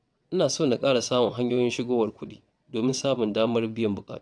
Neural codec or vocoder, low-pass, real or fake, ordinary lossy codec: vocoder, 22.05 kHz, 80 mel bands, WaveNeXt; 9.9 kHz; fake; none